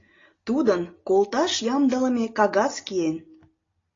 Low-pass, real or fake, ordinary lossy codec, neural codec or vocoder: 7.2 kHz; real; AAC, 32 kbps; none